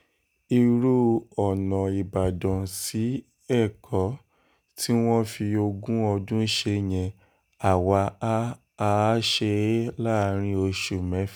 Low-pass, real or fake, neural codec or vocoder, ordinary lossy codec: none; real; none; none